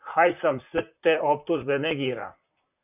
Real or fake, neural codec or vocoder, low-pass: fake; vocoder, 44.1 kHz, 128 mel bands, Pupu-Vocoder; 3.6 kHz